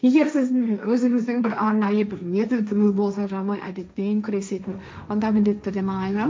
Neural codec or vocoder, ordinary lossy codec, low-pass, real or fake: codec, 16 kHz, 1.1 kbps, Voila-Tokenizer; none; none; fake